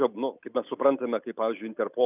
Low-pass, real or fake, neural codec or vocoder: 3.6 kHz; real; none